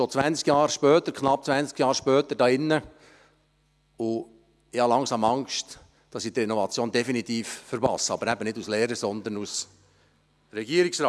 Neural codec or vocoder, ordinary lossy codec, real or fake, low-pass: none; none; real; none